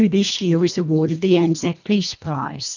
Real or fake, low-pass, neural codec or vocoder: fake; 7.2 kHz; codec, 24 kHz, 1.5 kbps, HILCodec